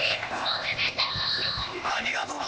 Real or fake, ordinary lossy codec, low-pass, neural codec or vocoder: fake; none; none; codec, 16 kHz, 0.8 kbps, ZipCodec